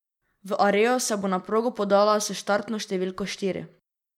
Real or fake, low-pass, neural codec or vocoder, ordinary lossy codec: real; 19.8 kHz; none; MP3, 96 kbps